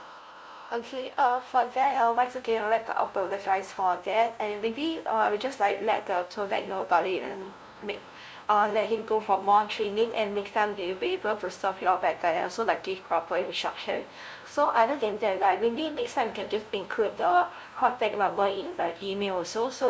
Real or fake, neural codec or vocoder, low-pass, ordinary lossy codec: fake; codec, 16 kHz, 0.5 kbps, FunCodec, trained on LibriTTS, 25 frames a second; none; none